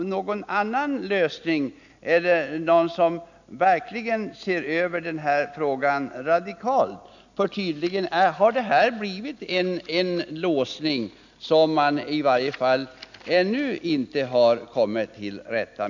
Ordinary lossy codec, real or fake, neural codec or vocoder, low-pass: none; real; none; 7.2 kHz